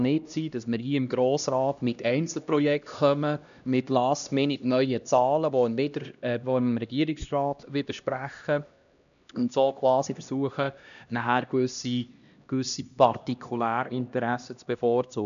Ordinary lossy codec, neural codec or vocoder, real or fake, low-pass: none; codec, 16 kHz, 1 kbps, X-Codec, HuBERT features, trained on LibriSpeech; fake; 7.2 kHz